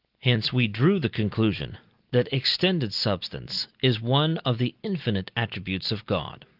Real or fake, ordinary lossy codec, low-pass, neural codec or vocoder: real; Opus, 32 kbps; 5.4 kHz; none